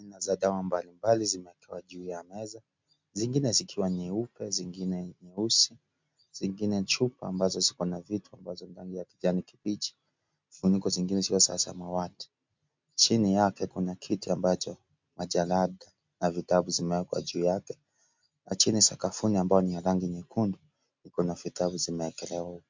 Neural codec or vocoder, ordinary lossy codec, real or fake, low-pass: none; MP3, 64 kbps; real; 7.2 kHz